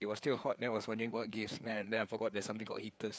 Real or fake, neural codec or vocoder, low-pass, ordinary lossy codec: fake; codec, 16 kHz, 4 kbps, FreqCodec, larger model; none; none